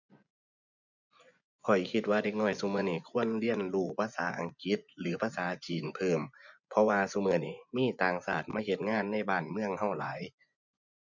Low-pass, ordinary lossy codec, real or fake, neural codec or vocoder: 7.2 kHz; none; fake; vocoder, 24 kHz, 100 mel bands, Vocos